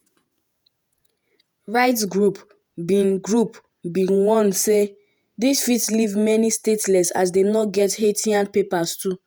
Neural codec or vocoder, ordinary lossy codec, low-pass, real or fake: vocoder, 48 kHz, 128 mel bands, Vocos; none; none; fake